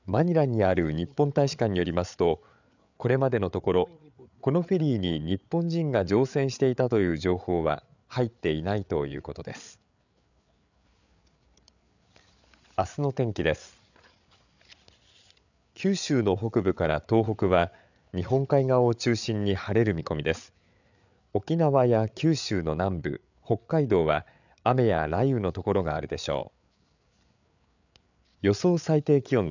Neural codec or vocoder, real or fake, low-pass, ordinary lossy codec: codec, 16 kHz, 8 kbps, FreqCodec, larger model; fake; 7.2 kHz; none